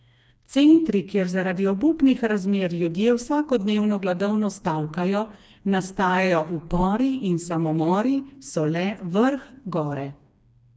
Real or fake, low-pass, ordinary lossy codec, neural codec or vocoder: fake; none; none; codec, 16 kHz, 2 kbps, FreqCodec, smaller model